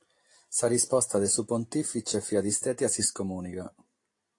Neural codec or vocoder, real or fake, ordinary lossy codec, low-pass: none; real; AAC, 48 kbps; 10.8 kHz